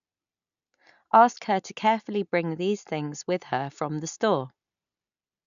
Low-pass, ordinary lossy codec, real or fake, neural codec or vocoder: 7.2 kHz; none; real; none